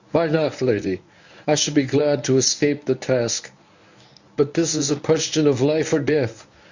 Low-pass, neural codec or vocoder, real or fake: 7.2 kHz; codec, 24 kHz, 0.9 kbps, WavTokenizer, medium speech release version 1; fake